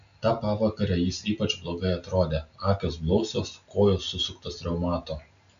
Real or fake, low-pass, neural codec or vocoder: real; 7.2 kHz; none